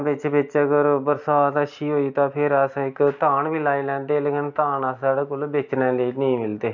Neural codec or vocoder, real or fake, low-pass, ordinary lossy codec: none; real; 7.2 kHz; none